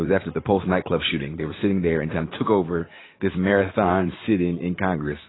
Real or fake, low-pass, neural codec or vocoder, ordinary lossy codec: fake; 7.2 kHz; vocoder, 44.1 kHz, 80 mel bands, Vocos; AAC, 16 kbps